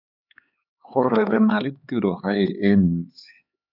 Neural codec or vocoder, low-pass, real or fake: codec, 16 kHz, 4 kbps, X-Codec, HuBERT features, trained on LibriSpeech; 5.4 kHz; fake